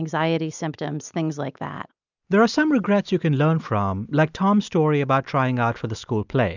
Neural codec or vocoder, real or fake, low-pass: none; real; 7.2 kHz